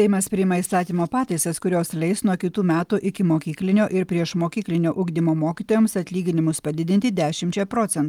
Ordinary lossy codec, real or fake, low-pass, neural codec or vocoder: Opus, 32 kbps; real; 19.8 kHz; none